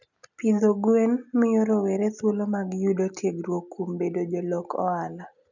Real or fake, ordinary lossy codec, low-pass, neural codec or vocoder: real; none; 7.2 kHz; none